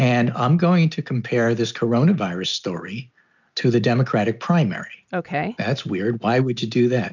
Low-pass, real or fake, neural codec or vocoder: 7.2 kHz; real; none